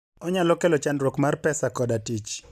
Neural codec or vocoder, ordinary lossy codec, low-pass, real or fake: none; none; 14.4 kHz; real